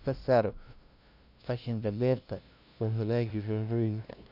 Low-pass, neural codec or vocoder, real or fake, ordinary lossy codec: 5.4 kHz; codec, 16 kHz, 0.5 kbps, FunCodec, trained on LibriTTS, 25 frames a second; fake; none